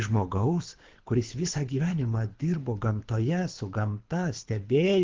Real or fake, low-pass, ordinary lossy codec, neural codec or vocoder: fake; 7.2 kHz; Opus, 16 kbps; codec, 24 kHz, 6 kbps, HILCodec